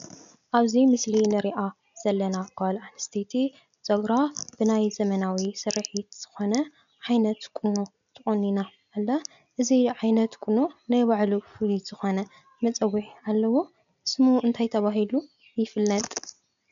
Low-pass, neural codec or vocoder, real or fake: 7.2 kHz; none; real